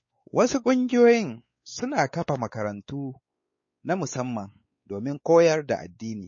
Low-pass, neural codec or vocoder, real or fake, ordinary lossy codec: 7.2 kHz; codec, 16 kHz, 4 kbps, X-Codec, WavLM features, trained on Multilingual LibriSpeech; fake; MP3, 32 kbps